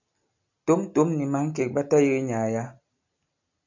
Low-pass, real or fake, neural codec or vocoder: 7.2 kHz; real; none